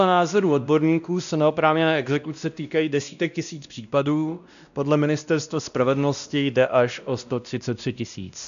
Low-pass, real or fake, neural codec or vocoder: 7.2 kHz; fake; codec, 16 kHz, 1 kbps, X-Codec, WavLM features, trained on Multilingual LibriSpeech